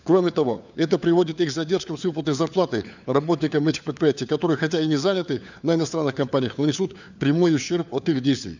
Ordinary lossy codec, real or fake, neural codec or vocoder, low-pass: none; fake; codec, 16 kHz, 4 kbps, FunCodec, trained on LibriTTS, 50 frames a second; 7.2 kHz